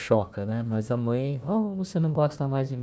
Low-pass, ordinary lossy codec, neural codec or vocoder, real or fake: none; none; codec, 16 kHz, 1 kbps, FunCodec, trained on Chinese and English, 50 frames a second; fake